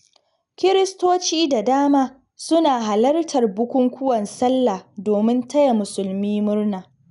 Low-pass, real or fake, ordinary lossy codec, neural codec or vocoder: 10.8 kHz; real; none; none